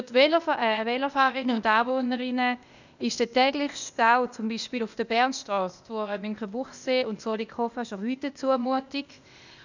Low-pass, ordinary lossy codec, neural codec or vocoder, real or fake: 7.2 kHz; none; codec, 16 kHz, 0.8 kbps, ZipCodec; fake